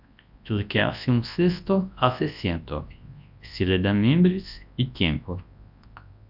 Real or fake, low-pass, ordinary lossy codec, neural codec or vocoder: fake; 5.4 kHz; AAC, 48 kbps; codec, 24 kHz, 0.9 kbps, WavTokenizer, large speech release